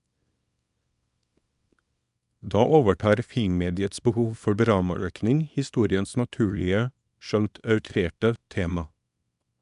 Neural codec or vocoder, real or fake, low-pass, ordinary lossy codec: codec, 24 kHz, 0.9 kbps, WavTokenizer, small release; fake; 10.8 kHz; none